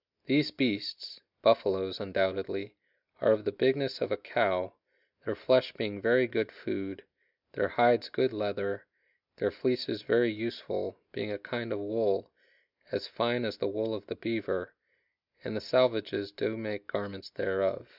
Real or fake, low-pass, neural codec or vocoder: real; 5.4 kHz; none